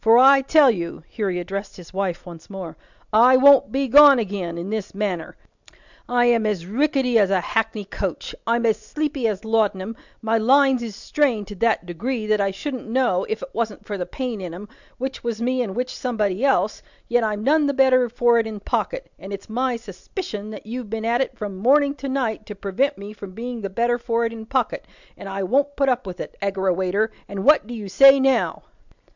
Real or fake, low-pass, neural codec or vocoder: real; 7.2 kHz; none